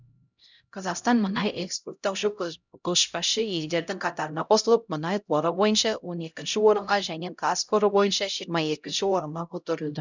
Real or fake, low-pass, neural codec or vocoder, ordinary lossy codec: fake; 7.2 kHz; codec, 16 kHz, 0.5 kbps, X-Codec, HuBERT features, trained on LibriSpeech; none